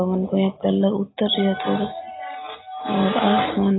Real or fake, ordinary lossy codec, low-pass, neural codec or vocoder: real; AAC, 16 kbps; 7.2 kHz; none